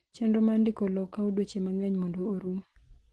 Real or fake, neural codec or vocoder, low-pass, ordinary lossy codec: real; none; 10.8 kHz; Opus, 16 kbps